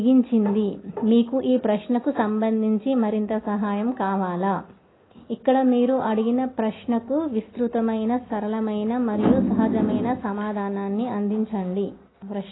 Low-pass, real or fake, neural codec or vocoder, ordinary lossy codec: 7.2 kHz; real; none; AAC, 16 kbps